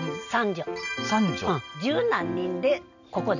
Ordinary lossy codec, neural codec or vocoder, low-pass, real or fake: none; none; 7.2 kHz; real